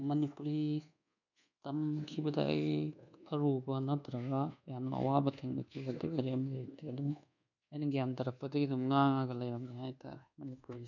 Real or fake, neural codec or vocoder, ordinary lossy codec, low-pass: fake; codec, 24 kHz, 1.2 kbps, DualCodec; none; 7.2 kHz